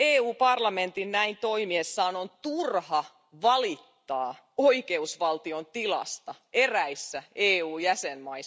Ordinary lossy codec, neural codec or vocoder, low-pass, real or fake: none; none; none; real